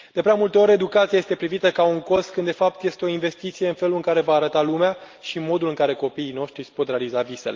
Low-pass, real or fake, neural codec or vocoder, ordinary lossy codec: 7.2 kHz; real; none; Opus, 32 kbps